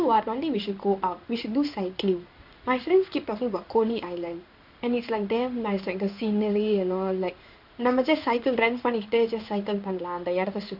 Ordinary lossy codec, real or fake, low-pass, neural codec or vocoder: none; fake; 5.4 kHz; codec, 16 kHz in and 24 kHz out, 1 kbps, XY-Tokenizer